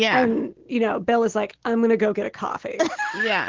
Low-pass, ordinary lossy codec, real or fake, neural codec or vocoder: 7.2 kHz; Opus, 16 kbps; real; none